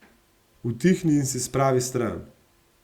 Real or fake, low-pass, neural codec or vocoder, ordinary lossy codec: real; 19.8 kHz; none; Opus, 64 kbps